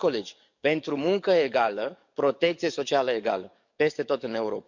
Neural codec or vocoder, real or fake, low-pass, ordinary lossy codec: codec, 44.1 kHz, 7.8 kbps, DAC; fake; 7.2 kHz; none